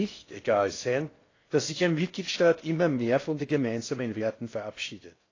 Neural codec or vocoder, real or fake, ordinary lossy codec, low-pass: codec, 16 kHz in and 24 kHz out, 0.6 kbps, FocalCodec, streaming, 4096 codes; fake; AAC, 32 kbps; 7.2 kHz